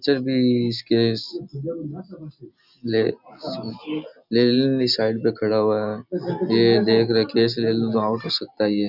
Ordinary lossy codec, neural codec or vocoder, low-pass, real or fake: none; none; 5.4 kHz; real